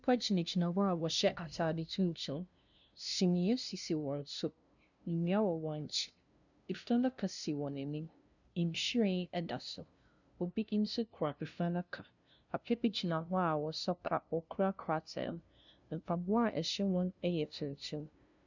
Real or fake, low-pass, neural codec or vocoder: fake; 7.2 kHz; codec, 16 kHz, 0.5 kbps, FunCodec, trained on LibriTTS, 25 frames a second